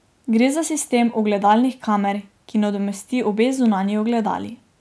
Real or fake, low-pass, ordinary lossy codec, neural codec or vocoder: real; none; none; none